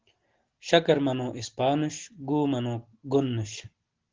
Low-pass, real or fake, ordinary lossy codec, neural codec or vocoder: 7.2 kHz; real; Opus, 16 kbps; none